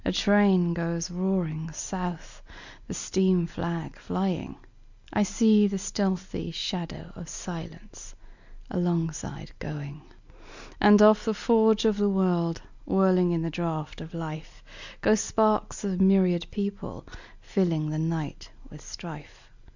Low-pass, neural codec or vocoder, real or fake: 7.2 kHz; none; real